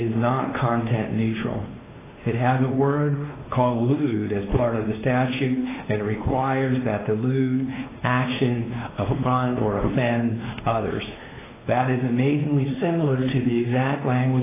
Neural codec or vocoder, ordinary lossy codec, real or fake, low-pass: codec, 16 kHz, 2 kbps, X-Codec, WavLM features, trained on Multilingual LibriSpeech; AAC, 16 kbps; fake; 3.6 kHz